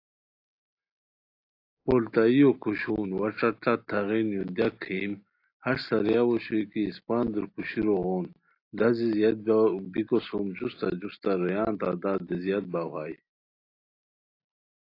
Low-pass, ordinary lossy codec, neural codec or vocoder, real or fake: 5.4 kHz; AAC, 32 kbps; none; real